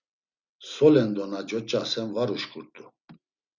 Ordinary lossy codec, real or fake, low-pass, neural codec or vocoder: Opus, 64 kbps; real; 7.2 kHz; none